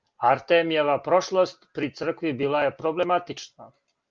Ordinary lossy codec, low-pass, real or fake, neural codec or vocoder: Opus, 24 kbps; 7.2 kHz; real; none